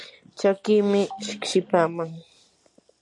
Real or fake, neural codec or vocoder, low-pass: real; none; 10.8 kHz